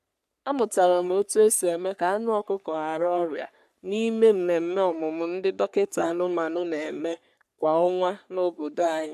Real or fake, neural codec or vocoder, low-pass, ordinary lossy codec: fake; codec, 44.1 kHz, 3.4 kbps, Pupu-Codec; 14.4 kHz; AAC, 96 kbps